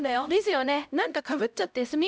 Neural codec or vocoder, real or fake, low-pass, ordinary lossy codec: codec, 16 kHz, 0.5 kbps, X-Codec, HuBERT features, trained on LibriSpeech; fake; none; none